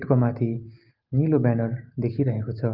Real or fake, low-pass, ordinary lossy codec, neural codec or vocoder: real; 5.4 kHz; Opus, 32 kbps; none